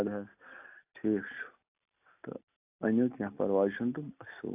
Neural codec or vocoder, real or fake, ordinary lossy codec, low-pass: none; real; AAC, 32 kbps; 3.6 kHz